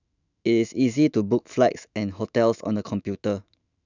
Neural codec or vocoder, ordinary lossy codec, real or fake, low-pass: autoencoder, 48 kHz, 128 numbers a frame, DAC-VAE, trained on Japanese speech; none; fake; 7.2 kHz